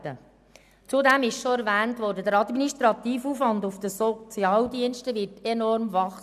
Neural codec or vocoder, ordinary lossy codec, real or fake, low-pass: none; none; real; 14.4 kHz